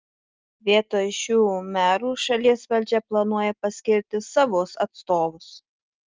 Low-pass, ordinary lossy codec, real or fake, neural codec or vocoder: 7.2 kHz; Opus, 24 kbps; real; none